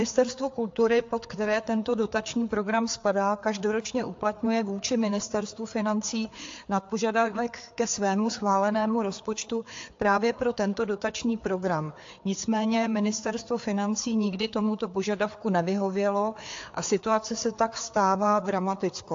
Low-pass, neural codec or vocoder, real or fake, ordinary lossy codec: 7.2 kHz; codec, 16 kHz, 4 kbps, FreqCodec, larger model; fake; AAC, 48 kbps